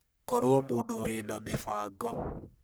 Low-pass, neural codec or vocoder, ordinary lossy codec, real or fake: none; codec, 44.1 kHz, 1.7 kbps, Pupu-Codec; none; fake